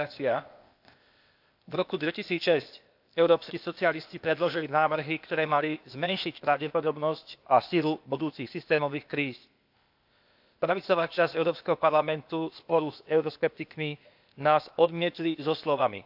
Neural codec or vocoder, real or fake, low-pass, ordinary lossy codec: codec, 16 kHz, 0.8 kbps, ZipCodec; fake; 5.4 kHz; AAC, 48 kbps